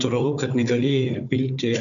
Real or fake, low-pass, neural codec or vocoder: fake; 7.2 kHz; codec, 16 kHz, 4 kbps, FunCodec, trained on Chinese and English, 50 frames a second